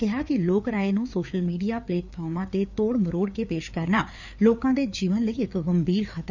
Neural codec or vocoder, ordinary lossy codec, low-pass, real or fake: codec, 16 kHz, 4 kbps, FreqCodec, larger model; none; 7.2 kHz; fake